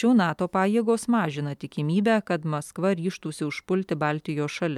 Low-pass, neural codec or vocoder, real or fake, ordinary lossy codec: 19.8 kHz; none; real; MP3, 96 kbps